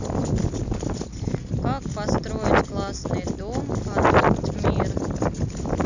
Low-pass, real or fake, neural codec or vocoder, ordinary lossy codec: 7.2 kHz; real; none; none